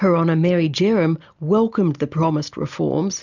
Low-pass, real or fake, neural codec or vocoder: 7.2 kHz; real; none